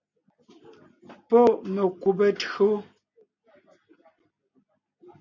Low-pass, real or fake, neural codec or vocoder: 7.2 kHz; real; none